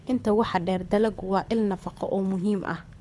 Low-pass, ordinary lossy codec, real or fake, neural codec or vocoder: none; none; fake; codec, 24 kHz, 6 kbps, HILCodec